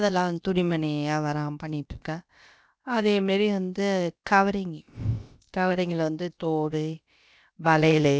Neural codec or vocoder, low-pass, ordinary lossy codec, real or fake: codec, 16 kHz, about 1 kbps, DyCAST, with the encoder's durations; none; none; fake